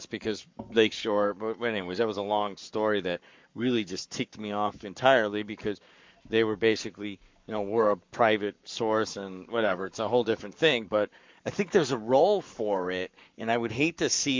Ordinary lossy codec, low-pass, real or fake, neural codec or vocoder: MP3, 64 kbps; 7.2 kHz; fake; codec, 44.1 kHz, 7.8 kbps, Pupu-Codec